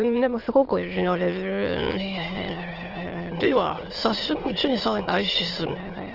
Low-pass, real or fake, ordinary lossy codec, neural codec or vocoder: 5.4 kHz; fake; Opus, 16 kbps; autoencoder, 22.05 kHz, a latent of 192 numbers a frame, VITS, trained on many speakers